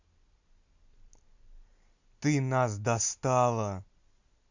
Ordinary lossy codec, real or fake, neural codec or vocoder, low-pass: Opus, 64 kbps; real; none; 7.2 kHz